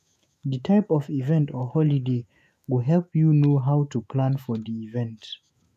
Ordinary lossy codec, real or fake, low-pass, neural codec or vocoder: none; fake; 14.4 kHz; autoencoder, 48 kHz, 128 numbers a frame, DAC-VAE, trained on Japanese speech